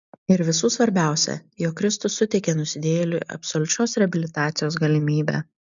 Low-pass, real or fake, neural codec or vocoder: 7.2 kHz; real; none